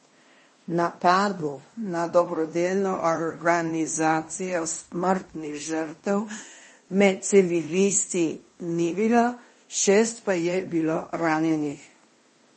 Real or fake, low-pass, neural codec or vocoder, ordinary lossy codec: fake; 10.8 kHz; codec, 16 kHz in and 24 kHz out, 0.9 kbps, LongCat-Audio-Codec, fine tuned four codebook decoder; MP3, 32 kbps